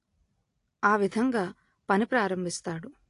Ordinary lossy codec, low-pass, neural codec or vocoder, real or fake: AAC, 48 kbps; 10.8 kHz; none; real